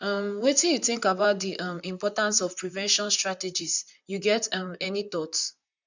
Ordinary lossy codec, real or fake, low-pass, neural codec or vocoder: none; fake; 7.2 kHz; vocoder, 44.1 kHz, 128 mel bands, Pupu-Vocoder